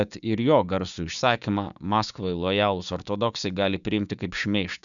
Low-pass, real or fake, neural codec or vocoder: 7.2 kHz; fake; codec, 16 kHz, 6 kbps, DAC